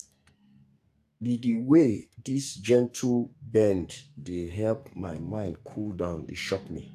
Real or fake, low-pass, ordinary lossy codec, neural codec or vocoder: fake; 14.4 kHz; AAC, 96 kbps; codec, 44.1 kHz, 2.6 kbps, SNAC